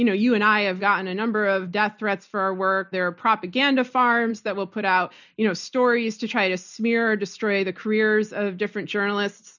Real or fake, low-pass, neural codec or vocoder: real; 7.2 kHz; none